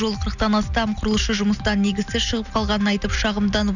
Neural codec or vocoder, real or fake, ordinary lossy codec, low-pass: none; real; none; 7.2 kHz